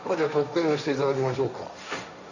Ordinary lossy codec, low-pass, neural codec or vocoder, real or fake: none; 7.2 kHz; codec, 16 kHz, 1.1 kbps, Voila-Tokenizer; fake